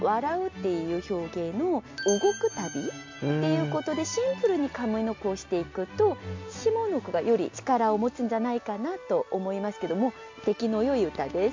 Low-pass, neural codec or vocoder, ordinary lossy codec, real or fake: 7.2 kHz; none; MP3, 48 kbps; real